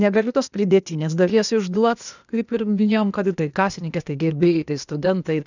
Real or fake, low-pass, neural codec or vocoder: fake; 7.2 kHz; codec, 16 kHz, 0.8 kbps, ZipCodec